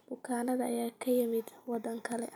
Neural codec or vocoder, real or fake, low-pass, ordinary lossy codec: none; real; none; none